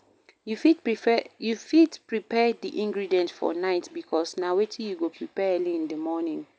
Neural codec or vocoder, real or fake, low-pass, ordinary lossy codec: none; real; none; none